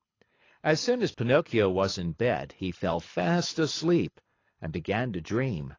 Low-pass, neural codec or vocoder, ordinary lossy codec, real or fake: 7.2 kHz; codec, 24 kHz, 6 kbps, HILCodec; AAC, 32 kbps; fake